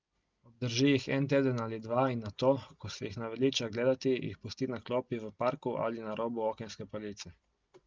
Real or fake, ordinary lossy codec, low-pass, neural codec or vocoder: real; Opus, 24 kbps; 7.2 kHz; none